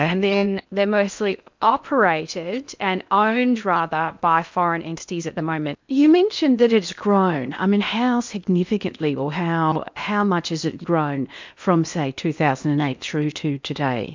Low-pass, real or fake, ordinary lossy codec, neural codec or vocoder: 7.2 kHz; fake; MP3, 64 kbps; codec, 16 kHz in and 24 kHz out, 0.8 kbps, FocalCodec, streaming, 65536 codes